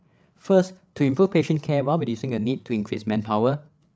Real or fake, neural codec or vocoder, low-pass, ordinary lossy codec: fake; codec, 16 kHz, 8 kbps, FreqCodec, larger model; none; none